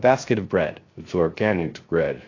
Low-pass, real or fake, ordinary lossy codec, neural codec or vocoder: 7.2 kHz; fake; AAC, 32 kbps; codec, 16 kHz, 0.3 kbps, FocalCodec